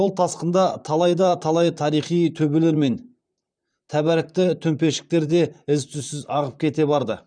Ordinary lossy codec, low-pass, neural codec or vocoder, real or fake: none; 9.9 kHz; vocoder, 44.1 kHz, 128 mel bands, Pupu-Vocoder; fake